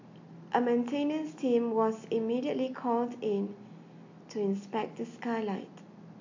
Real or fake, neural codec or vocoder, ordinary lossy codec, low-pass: real; none; AAC, 48 kbps; 7.2 kHz